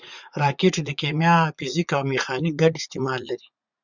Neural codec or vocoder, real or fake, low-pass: vocoder, 44.1 kHz, 80 mel bands, Vocos; fake; 7.2 kHz